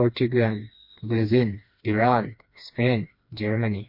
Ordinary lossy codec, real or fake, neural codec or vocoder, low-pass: MP3, 32 kbps; fake; codec, 16 kHz, 2 kbps, FreqCodec, smaller model; 5.4 kHz